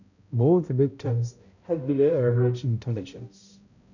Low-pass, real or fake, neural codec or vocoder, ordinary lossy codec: 7.2 kHz; fake; codec, 16 kHz, 0.5 kbps, X-Codec, HuBERT features, trained on balanced general audio; none